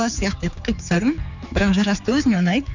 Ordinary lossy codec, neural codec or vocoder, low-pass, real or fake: none; codec, 16 kHz, 4 kbps, X-Codec, HuBERT features, trained on general audio; 7.2 kHz; fake